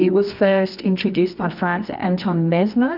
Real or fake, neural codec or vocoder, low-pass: fake; codec, 24 kHz, 0.9 kbps, WavTokenizer, medium music audio release; 5.4 kHz